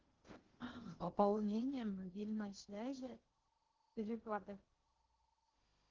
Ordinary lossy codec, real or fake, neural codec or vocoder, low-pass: Opus, 16 kbps; fake; codec, 16 kHz in and 24 kHz out, 0.6 kbps, FocalCodec, streaming, 2048 codes; 7.2 kHz